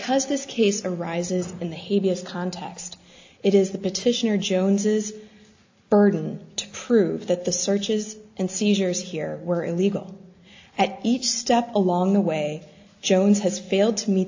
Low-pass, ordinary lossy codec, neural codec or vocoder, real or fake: 7.2 kHz; AAC, 48 kbps; none; real